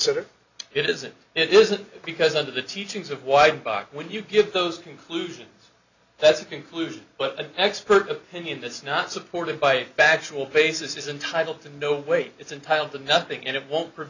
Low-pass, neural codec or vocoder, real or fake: 7.2 kHz; none; real